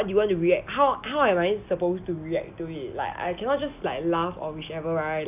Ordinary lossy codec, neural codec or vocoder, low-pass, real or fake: AAC, 32 kbps; none; 3.6 kHz; real